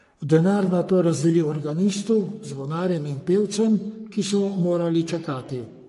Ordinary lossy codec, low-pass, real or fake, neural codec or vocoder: MP3, 48 kbps; 14.4 kHz; fake; codec, 44.1 kHz, 3.4 kbps, Pupu-Codec